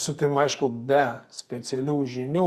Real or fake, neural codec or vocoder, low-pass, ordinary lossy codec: fake; codec, 44.1 kHz, 2.6 kbps, SNAC; 14.4 kHz; Opus, 64 kbps